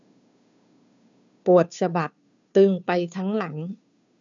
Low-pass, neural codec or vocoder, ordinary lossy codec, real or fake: 7.2 kHz; codec, 16 kHz, 2 kbps, FunCodec, trained on Chinese and English, 25 frames a second; none; fake